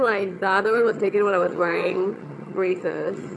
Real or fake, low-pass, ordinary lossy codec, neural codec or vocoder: fake; none; none; vocoder, 22.05 kHz, 80 mel bands, HiFi-GAN